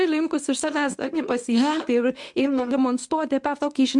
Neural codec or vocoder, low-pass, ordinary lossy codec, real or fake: codec, 24 kHz, 0.9 kbps, WavTokenizer, medium speech release version 1; 10.8 kHz; MP3, 64 kbps; fake